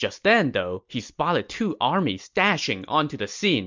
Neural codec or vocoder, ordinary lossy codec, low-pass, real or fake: none; MP3, 64 kbps; 7.2 kHz; real